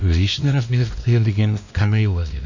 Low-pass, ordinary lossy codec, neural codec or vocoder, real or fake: 7.2 kHz; none; codec, 16 kHz, 1 kbps, X-Codec, WavLM features, trained on Multilingual LibriSpeech; fake